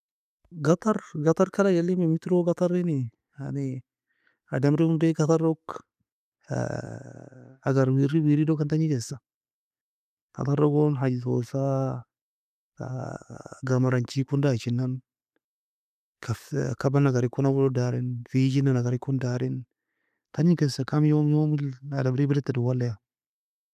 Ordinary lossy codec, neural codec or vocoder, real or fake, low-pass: none; none; real; 14.4 kHz